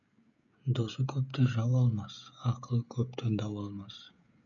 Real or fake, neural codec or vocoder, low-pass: fake; codec, 16 kHz, 16 kbps, FreqCodec, smaller model; 7.2 kHz